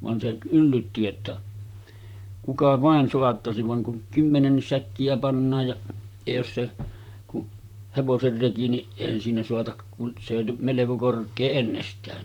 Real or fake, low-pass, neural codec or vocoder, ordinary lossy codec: fake; 19.8 kHz; vocoder, 44.1 kHz, 128 mel bands, Pupu-Vocoder; none